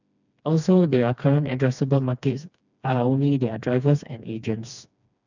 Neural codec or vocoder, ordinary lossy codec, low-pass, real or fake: codec, 16 kHz, 2 kbps, FreqCodec, smaller model; none; 7.2 kHz; fake